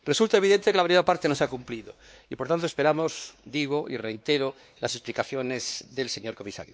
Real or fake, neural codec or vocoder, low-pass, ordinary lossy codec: fake; codec, 16 kHz, 2 kbps, X-Codec, WavLM features, trained on Multilingual LibriSpeech; none; none